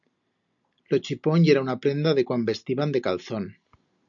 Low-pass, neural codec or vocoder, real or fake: 7.2 kHz; none; real